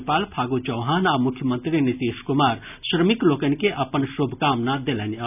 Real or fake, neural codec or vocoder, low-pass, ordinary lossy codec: real; none; 3.6 kHz; none